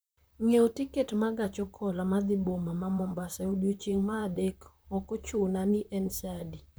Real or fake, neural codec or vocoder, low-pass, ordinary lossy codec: fake; vocoder, 44.1 kHz, 128 mel bands, Pupu-Vocoder; none; none